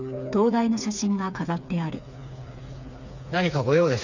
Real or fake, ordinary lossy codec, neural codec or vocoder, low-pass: fake; none; codec, 16 kHz, 4 kbps, FreqCodec, smaller model; 7.2 kHz